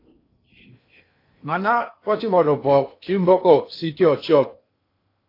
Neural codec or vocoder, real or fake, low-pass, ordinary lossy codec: codec, 16 kHz in and 24 kHz out, 0.8 kbps, FocalCodec, streaming, 65536 codes; fake; 5.4 kHz; AAC, 32 kbps